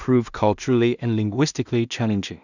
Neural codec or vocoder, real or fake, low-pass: codec, 16 kHz in and 24 kHz out, 0.4 kbps, LongCat-Audio-Codec, two codebook decoder; fake; 7.2 kHz